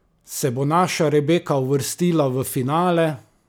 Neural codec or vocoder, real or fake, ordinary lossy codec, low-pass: none; real; none; none